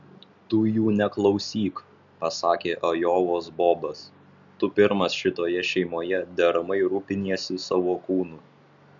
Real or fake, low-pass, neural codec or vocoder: real; 7.2 kHz; none